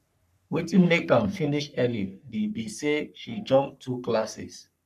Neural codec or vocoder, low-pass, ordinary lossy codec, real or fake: codec, 44.1 kHz, 3.4 kbps, Pupu-Codec; 14.4 kHz; none; fake